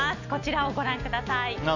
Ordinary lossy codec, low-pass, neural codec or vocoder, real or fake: none; 7.2 kHz; none; real